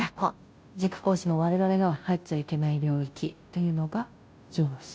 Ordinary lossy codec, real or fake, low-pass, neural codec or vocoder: none; fake; none; codec, 16 kHz, 0.5 kbps, FunCodec, trained on Chinese and English, 25 frames a second